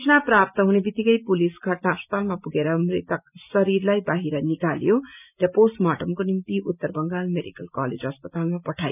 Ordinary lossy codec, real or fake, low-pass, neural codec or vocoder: none; real; 3.6 kHz; none